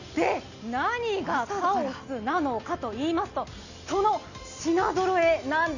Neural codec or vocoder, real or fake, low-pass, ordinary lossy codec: none; real; 7.2 kHz; none